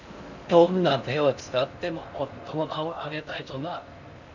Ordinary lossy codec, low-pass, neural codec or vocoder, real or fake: none; 7.2 kHz; codec, 16 kHz in and 24 kHz out, 0.6 kbps, FocalCodec, streaming, 4096 codes; fake